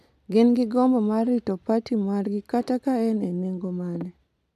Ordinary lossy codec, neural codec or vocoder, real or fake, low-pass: AAC, 96 kbps; none; real; 14.4 kHz